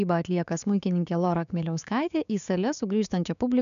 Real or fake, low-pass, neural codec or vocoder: fake; 7.2 kHz; codec, 16 kHz, 6 kbps, DAC